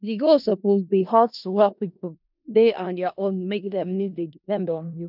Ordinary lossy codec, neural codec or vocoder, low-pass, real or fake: none; codec, 16 kHz in and 24 kHz out, 0.4 kbps, LongCat-Audio-Codec, four codebook decoder; 5.4 kHz; fake